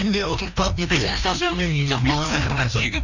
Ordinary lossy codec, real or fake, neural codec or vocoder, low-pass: none; fake; codec, 16 kHz, 1 kbps, FreqCodec, larger model; 7.2 kHz